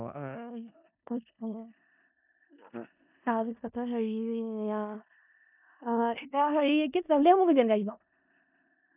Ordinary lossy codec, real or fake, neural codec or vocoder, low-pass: none; fake; codec, 16 kHz in and 24 kHz out, 0.4 kbps, LongCat-Audio-Codec, four codebook decoder; 3.6 kHz